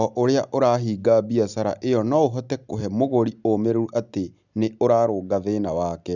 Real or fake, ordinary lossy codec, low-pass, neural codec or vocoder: real; none; 7.2 kHz; none